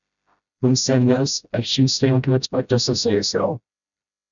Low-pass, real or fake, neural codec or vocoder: 7.2 kHz; fake; codec, 16 kHz, 0.5 kbps, FreqCodec, smaller model